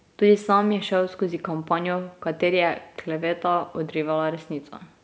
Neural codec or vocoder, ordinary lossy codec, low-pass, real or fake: none; none; none; real